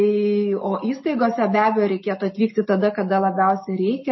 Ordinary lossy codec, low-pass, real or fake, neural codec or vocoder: MP3, 24 kbps; 7.2 kHz; real; none